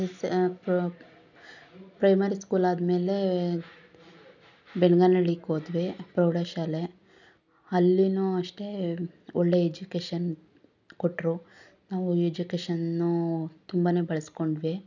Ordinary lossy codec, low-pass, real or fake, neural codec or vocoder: none; 7.2 kHz; real; none